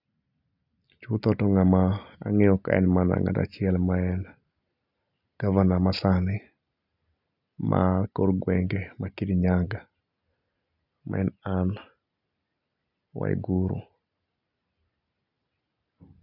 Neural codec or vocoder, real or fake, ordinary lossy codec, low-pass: none; real; none; 5.4 kHz